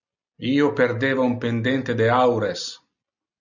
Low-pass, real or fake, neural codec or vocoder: 7.2 kHz; real; none